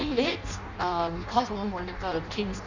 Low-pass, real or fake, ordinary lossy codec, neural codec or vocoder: 7.2 kHz; fake; Opus, 64 kbps; codec, 16 kHz in and 24 kHz out, 0.6 kbps, FireRedTTS-2 codec